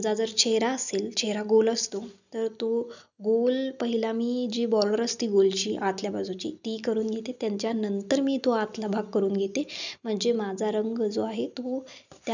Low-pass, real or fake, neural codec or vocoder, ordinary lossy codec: 7.2 kHz; real; none; none